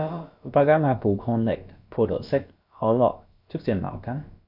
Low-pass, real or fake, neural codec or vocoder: 5.4 kHz; fake; codec, 16 kHz, about 1 kbps, DyCAST, with the encoder's durations